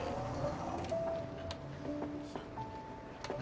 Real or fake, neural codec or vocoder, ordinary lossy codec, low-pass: real; none; none; none